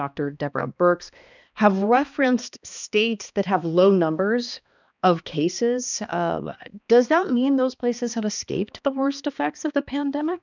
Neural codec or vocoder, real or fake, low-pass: codec, 16 kHz, 2 kbps, X-Codec, HuBERT features, trained on balanced general audio; fake; 7.2 kHz